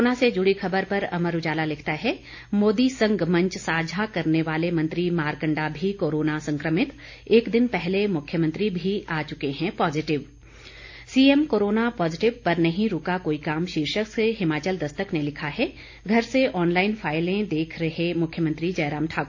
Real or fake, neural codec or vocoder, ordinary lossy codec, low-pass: real; none; AAC, 48 kbps; 7.2 kHz